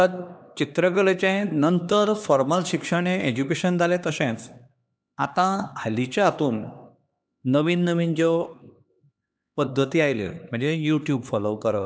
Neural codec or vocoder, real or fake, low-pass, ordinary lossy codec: codec, 16 kHz, 2 kbps, X-Codec, HuBERT features, trained on LibriSpeech; fake; none; none